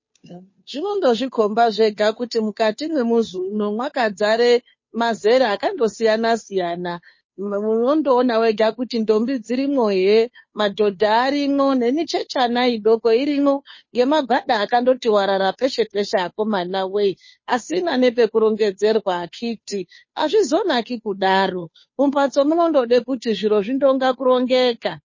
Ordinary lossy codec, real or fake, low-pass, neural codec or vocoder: MP3, 32 kbps; fake; 7.2 kHz; codec, 16 kHz, 2 kbps, FunCodec, trained on Chinese and English, 25 frames a second